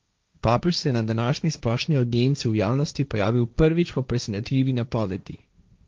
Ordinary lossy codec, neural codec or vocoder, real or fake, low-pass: Opus, 32 kbps; codec, 16 kHz, 1.1 kbps, Voila-Tokenizer; fake; 7.2 kHz